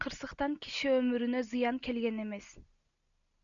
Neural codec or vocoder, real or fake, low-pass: none; real; 7.2 kHz